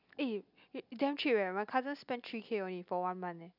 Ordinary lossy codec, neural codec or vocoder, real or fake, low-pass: none; none; real; 5.4 kHz